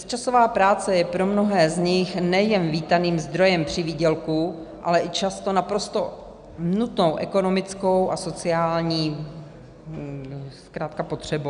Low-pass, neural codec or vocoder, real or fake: 9.9 kHz; none; real